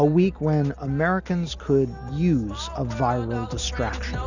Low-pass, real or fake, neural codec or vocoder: 7.2 kHz; real; none